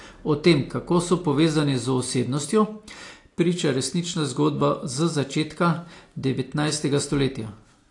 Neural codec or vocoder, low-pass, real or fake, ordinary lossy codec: none; 10.8 kHz; real; AAC, 48 kbps